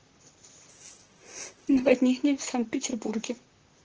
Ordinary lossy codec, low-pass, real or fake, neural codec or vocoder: Opus, 16 kbps; 7.2 kHz; fake; vocoder, 44.1 kHz, 128 mel bands, Pupu-Vocoder